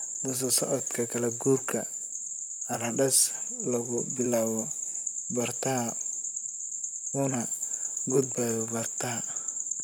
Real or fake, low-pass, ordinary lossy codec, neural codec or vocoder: fake; none; none; vocoder, 44.1 kHz, 128 mel bands, Pupu-Vocoder